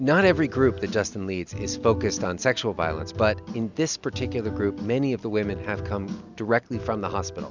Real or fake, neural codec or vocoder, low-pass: real; none; 7.2 kHz